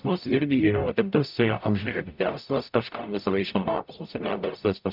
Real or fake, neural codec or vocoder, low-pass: fake; codec, 44.1 kHz, 0.9 kbps, DAC; 5.4 kHz